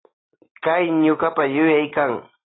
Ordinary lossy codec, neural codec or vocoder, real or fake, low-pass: AAC, 16 kbps; none; real; 7.2 kHz